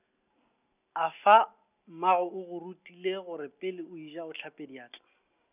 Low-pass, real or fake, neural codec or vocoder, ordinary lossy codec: 3.6 kHz; real; none; none